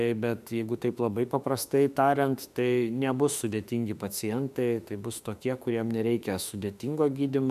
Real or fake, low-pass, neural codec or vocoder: fake; 14.4 kHz; autoencoder, 48 kHz, 32 numbers a frame, DAC-VAE, trained on Japanese speech